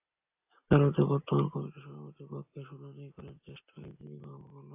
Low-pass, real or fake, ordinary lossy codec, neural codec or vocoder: 3.6 kHz; real; AAC, 32 kbps; none